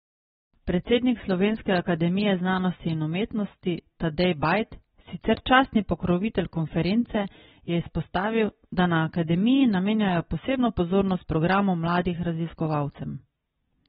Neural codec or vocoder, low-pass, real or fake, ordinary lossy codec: none; 10.8 kHz; real; AAC, 16 kbps